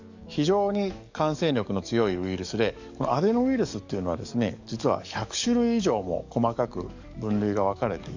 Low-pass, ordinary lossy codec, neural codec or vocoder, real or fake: 7.2 kHz; Opus, 64 kbps; codec, 44.1 kHz, 7.8 kbps, DAC; fake